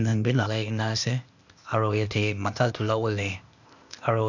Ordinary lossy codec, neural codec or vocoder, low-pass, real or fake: none; codec, 16 kHz, 0.8 kbps, ZipCodec; 7.2 kHz; fake